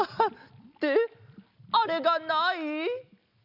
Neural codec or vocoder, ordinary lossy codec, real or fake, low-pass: none; none; real; 5.4 kHz